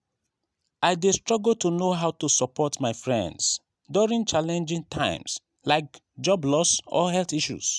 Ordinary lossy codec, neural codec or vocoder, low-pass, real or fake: none; none; none; real